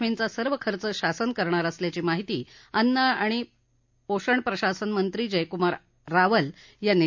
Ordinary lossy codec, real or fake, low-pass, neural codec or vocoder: MP3, 48 kbps; real; 7.2 kHz; none